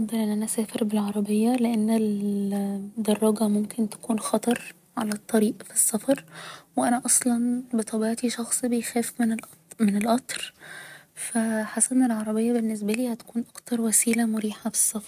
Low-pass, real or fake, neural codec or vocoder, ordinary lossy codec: 14.4 kHz; real; none; none